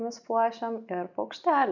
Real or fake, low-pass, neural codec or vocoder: real; 7.2 kHz; none